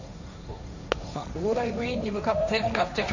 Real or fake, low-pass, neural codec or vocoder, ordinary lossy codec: fake; 7.2 kHz; codec, 16 kHz, 1.1 kbps, Voila-Tokenizer; none